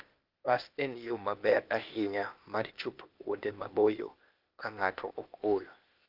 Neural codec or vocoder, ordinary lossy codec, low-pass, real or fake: codec, 16 kHz, 0.8 kbps, ZipCodec; Opus, 24 kbps; 5.4 kHz; fake